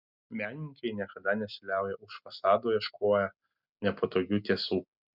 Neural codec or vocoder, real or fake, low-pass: none; real; 5.4 kHz